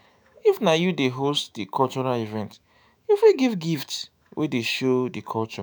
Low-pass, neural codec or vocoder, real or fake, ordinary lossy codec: none; autoencoder, 48 kHz, 128 numbers a frame, DAC-VAE, trained on Japanese speech; fake; none